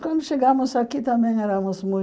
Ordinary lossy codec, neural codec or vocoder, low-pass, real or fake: none; none; none; real